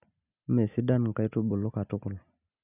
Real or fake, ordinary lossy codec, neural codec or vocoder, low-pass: real; none; none; 3.6 kHz